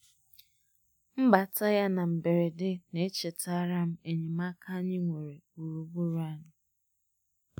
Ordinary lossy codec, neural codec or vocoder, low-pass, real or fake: none; none; none; real